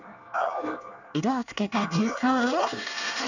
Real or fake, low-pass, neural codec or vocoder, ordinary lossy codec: fake; 7.2 kHz; codec, 24 kHz, 1 kbps, SNAC; none